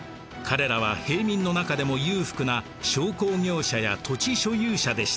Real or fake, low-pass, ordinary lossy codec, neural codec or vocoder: real; none; none; none